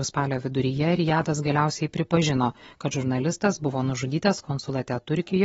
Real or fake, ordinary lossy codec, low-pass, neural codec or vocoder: real; AAC, 24 kbps; 19.8 kHz; none